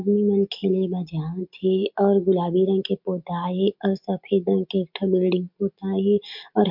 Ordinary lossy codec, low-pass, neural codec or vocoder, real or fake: MP3, 48 kbps; 5.4 kHz; none; real